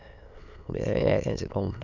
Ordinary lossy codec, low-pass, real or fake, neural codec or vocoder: none; 7.2 kHz; fake; autoencoder, 22.05 kHz, a latent of 192 numbers a frame, VITS, trained on many speakers